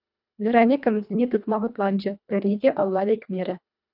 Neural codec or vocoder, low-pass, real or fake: codec, 24 kHz, 1.5 kbps, HILCodec; 5.4 kHz; fake